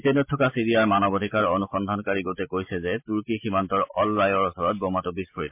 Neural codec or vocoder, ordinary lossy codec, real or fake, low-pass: none; MP3, 32 kbps; real; 3.6 kHz